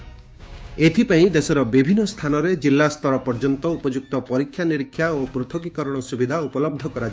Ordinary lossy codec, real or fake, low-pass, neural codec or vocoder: none; fake; none; codec, 16 kHz, 6 kbps, DAC